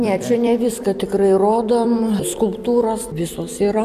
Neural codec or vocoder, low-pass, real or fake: vocoder, 44.1 kHz, 128 mel bands every 256 samples, BigVGAN v2; 14.4 kHz; fake